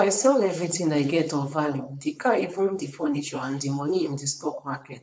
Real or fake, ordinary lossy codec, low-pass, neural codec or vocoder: fake; none; none; codec, 16 kHz, 4.8 kbps, FACodec